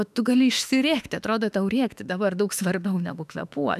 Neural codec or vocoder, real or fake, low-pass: autoencoder, 48 kHz, 32 numbers a frame, DAC-VAE, trained on Japanese speech; fake; 14.4 kHz